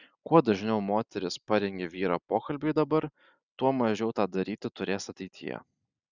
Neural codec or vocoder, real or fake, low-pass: none; real; 7.2 kHz